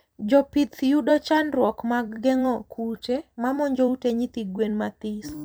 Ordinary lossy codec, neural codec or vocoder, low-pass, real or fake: none; vocoder, 44.1 kHz, 128 mel bands every 256 samples, BigVGAN v2; none; fake